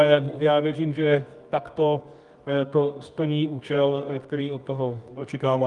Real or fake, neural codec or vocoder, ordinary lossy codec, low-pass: fake; codec, 24 kHz, 0.9 kbps, WavTokenizer, medium music audio release; Opus, 32 kbps; 10.8 kHz